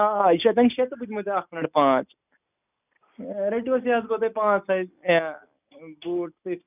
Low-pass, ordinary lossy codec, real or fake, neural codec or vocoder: 3.6 kHz; none; real; none